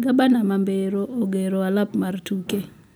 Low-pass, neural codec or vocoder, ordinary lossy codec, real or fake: none; none; none; real